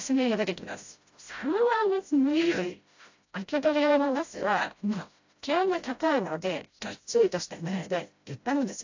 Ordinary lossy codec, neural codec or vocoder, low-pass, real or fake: AAC, 48 kbps; codec, 16 kHz, 0.5 kbps, FreqCodec, smaller model; 7.2 kHz; fake